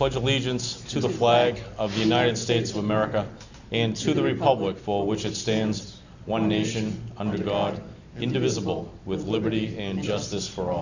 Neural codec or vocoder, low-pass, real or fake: none; 7.2 kHz; real